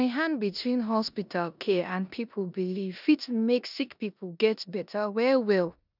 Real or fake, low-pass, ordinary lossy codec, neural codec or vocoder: fake; 5.4 kHz; none; codec, 16 kHz in and 24 kHz out, 0.9 kbps, LongCat-Audio-Codec, four codebook decoder